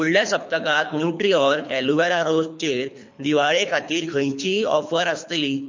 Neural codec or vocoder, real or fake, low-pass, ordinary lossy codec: codec, 24 kHz, 3 kbps, HILCodec; fake; 7.2 kHz; MP3, 48 kbps